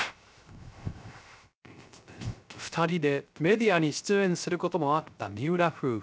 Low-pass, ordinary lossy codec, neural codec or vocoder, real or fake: none; none; codec, 16 kHz, 0.3 kbps, FocalCodec; fake